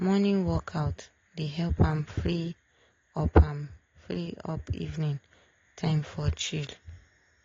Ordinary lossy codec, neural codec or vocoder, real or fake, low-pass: AAC, 32 kbps; none; real; 7.2 kHz